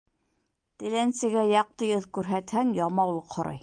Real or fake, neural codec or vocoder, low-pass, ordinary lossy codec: real; none; 9.9 kHz; Opus, 32 kbps